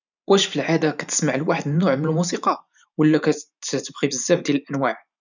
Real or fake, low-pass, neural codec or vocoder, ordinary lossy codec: fake; 7.2 kHz; vocoder, 44.1 kHz, 128 mel bands every 512 samples, BigVGAN v2; none